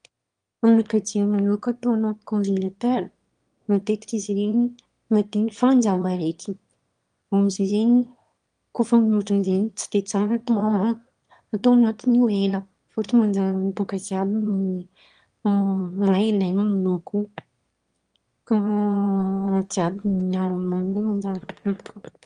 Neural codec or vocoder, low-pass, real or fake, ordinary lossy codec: autoencoder, 22.05 kHz, a latent of 192 numbers a frame, VITS, trained on one speaker; 9.9 kHz; fake; Opus, 32 kbps